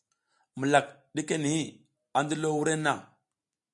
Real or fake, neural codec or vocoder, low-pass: real; none; 10.8 kHz